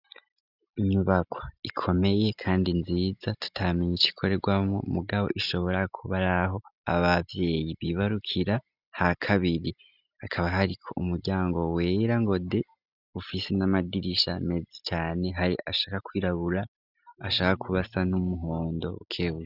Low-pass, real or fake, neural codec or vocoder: 5.4 kHz; real; none